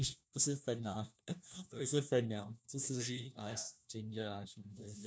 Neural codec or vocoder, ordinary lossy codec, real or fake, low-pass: codec, 16 kHz, 1 kbps, FunCodec, trained on LibriTTS, 50 frames a second; none; fake; none